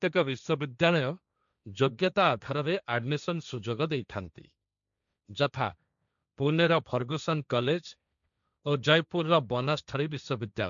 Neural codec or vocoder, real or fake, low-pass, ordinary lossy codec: codec, 16 kHz, 1.1 kbps, Voila-Tokenizer; fake; 7.2 kHz; none